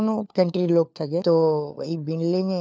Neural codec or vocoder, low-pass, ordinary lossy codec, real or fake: codec, 16 kHz, 4 kbps, FunCodec, trained on LibriTTS, 50 frames a second; none; none; fake